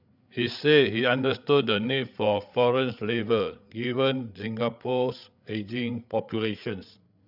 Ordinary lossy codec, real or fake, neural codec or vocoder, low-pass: none; fake; codec, 16 kHz, 8 kbps, FreqCodec, larger model; 5.4 kHz